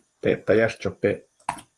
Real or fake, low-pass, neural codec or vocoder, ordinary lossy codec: fake; 10.8 kHz; vocoder, 24 kHz, 100 mel bands, Vocos; Opus, 24 kbps